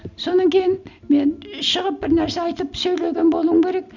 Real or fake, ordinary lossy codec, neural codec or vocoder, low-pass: real; none; none; 7.2 kHz